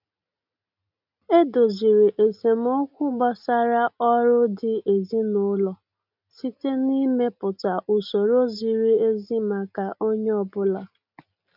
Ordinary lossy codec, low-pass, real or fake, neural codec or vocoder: none; 5.4 kHz; real; none